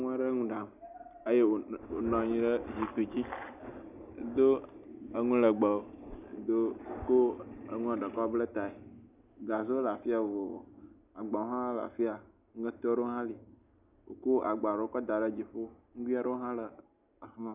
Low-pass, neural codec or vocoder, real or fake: 3.6 kHz; none; real